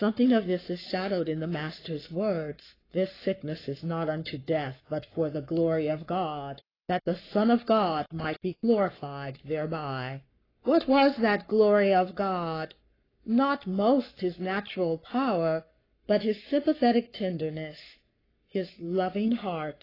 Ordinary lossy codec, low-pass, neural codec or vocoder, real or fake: AAC, 24 kbps; 5.4 kHz; codec, 44.1 kHz, 7.8 kbps, Pupu-Codec; fake